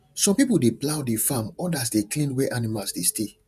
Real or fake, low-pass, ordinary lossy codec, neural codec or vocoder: fake; 14.4 kHz; none; vocoder, 48 kHz, 128 mel bands, Vocos